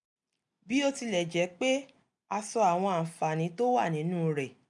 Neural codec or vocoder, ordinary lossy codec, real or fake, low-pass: none; none; real; 10.8 kHz